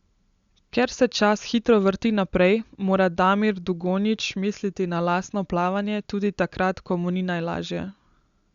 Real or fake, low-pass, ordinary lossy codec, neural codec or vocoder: real; 7.2 kHz; Opus, 64 kbps; none